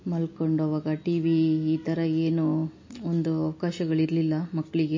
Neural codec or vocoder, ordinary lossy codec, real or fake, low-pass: none; MP3, 32 kbps; real; 7.2 kHz